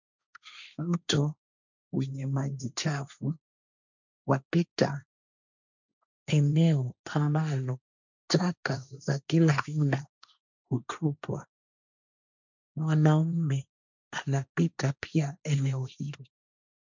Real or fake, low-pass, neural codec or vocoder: fake; 7.2 kHz; codec, 16 kHz, 1.1 kbps, Voila-Tokenizer